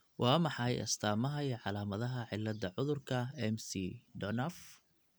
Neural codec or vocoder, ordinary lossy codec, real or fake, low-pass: none; none; real; none